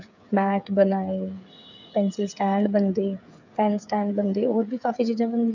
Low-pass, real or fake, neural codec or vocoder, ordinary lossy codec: 7.2 kHz; fake; codec, 16 kHz, 8 kbps, FreqCodec, smaller model; none